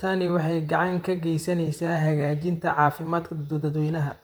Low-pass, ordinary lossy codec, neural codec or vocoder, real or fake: none; none; vocoder, 44.1 kHz, 128 mel bands, Pupu-Vocoder; fake